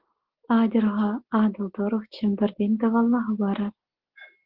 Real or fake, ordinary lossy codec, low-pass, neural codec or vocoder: real; Opus, 16 kbps; 5.4 kHz; none